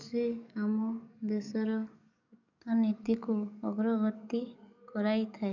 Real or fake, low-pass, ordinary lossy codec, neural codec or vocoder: fake; 7.2 kHz; none; codec, 44.1 kHz, 7.8 kbps, DAC